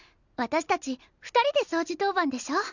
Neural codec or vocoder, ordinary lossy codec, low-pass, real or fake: none; none; 7.2 kHz; real